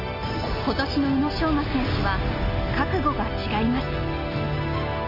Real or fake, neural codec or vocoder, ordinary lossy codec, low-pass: real; none; none; 5.4 kHz